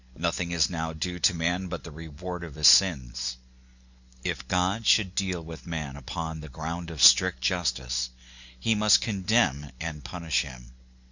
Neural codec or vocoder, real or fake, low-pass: none; real; 7.2 kHz